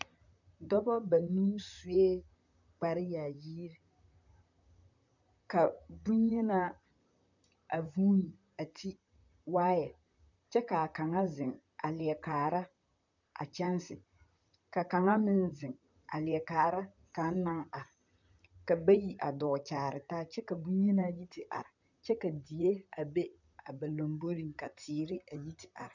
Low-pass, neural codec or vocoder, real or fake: 7.2 kHz; vocoder, 44.1 kHz, 128 mel bands, Pupu-Vocoder; fake